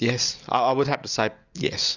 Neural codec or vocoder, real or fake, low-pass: none; real; 7.2 kHz